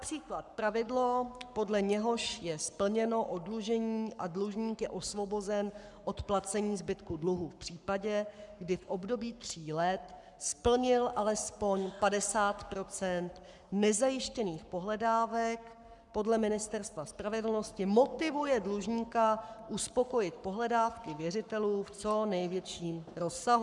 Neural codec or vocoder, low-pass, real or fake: codec, 44.1 kHz, 7.8 kbps, Pupu-Codec; 10.8 kHz; fake